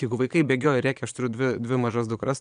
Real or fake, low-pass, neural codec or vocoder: fake; 9.9 kHz; vocoder, 22.05 kHz, 80 mel bands, Vocos